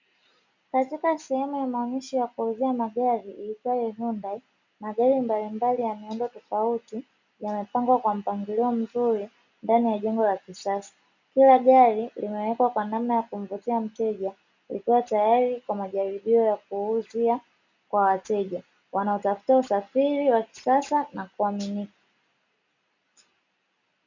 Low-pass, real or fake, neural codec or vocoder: 7.2 kHz; real; none